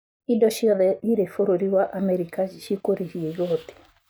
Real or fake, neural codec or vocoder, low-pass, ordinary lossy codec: real; none; none; none